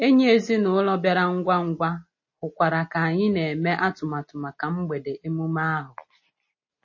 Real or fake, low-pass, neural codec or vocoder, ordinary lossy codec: real; 7.2 kHz; none; MP3, 32 kbps